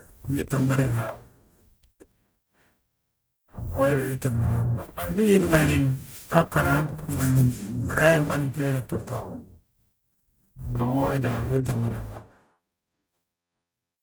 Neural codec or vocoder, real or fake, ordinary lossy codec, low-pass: codec, 44.1 kHz, 0.9 kbps, DAC; fake; none; none